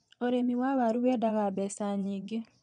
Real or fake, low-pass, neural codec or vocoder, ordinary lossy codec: fake; 9.9 kHz; vocoder, 22.05 kHz, 80 mel bands, Vocos; none